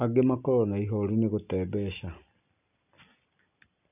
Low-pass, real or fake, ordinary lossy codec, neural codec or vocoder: 3.6 kHz; real; none; none